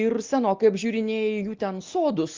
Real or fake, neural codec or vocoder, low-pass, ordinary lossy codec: real; none; 7.2 kHz; Opus, 32 kbps